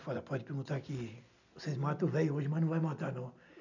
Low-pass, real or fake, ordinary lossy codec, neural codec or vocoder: 7.2 kHz; real; none; none